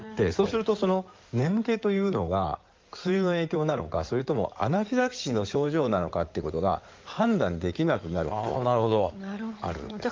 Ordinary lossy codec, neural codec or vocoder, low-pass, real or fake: Opus, 24 kbps; codec, 16 kHz in and 24 kHz out, 2.2 kbps, FireRedTTS-2 codec; 7.2 kHz; fake